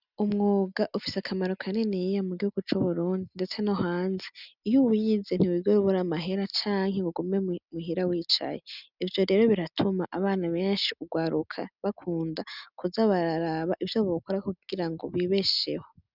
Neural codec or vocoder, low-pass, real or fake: none; 5.4 kHz; real